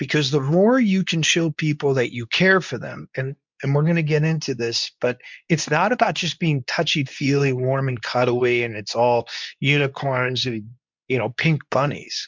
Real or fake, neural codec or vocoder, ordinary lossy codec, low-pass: fake; codec, 24 kHz, 0.9 kbps, WavTokenizer, medium speech release version 2; MP3, 64 kbps; 7.2 kHz